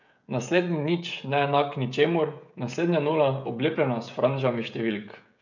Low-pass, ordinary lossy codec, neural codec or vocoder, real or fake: 7.2 kHz; none; codec, 16 kHz, 16 kbps, FreqCodec, smaller model; fake